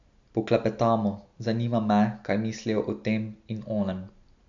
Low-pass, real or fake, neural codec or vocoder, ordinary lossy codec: 7.2 kHz; real; none; none